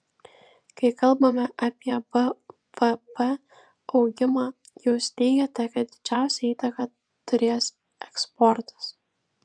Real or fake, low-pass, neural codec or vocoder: fake; 9.9 kHz; vocoder, 24 kHz, 100 mel bands, Vocos